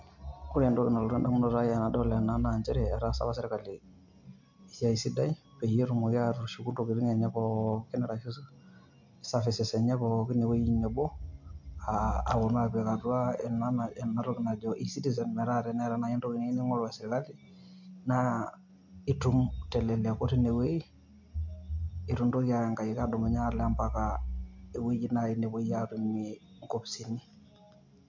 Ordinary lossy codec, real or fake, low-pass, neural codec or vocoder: MP3, 48 kbps; real; 7.2 kHz; none